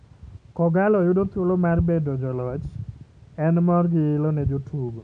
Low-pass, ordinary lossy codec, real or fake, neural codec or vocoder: 9.9 kHz; none; real; none